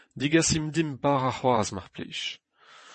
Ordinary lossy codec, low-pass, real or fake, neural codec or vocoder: MP3, 32 kbps; 9.9 kHz; fake; vocoder, 22.05 kHz, 80 mel bands, WaveNeXt